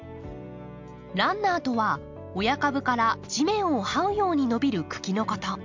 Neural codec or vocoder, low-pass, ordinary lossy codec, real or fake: none; 7.2 kHz; MP3, 64 kbps; real